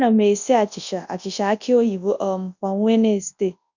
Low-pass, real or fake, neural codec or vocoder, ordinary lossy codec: 7.2 kHz; fake; codec, 24 kHz, 0.9 kbps, WavTokenizer, large speech release; none